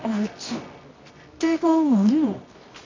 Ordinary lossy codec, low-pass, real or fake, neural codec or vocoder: MP3, 64 kbps; 7.2 kHz; fake; codec, 24 kHz, 0.9 kbps, WavTokenizer, medium music audio release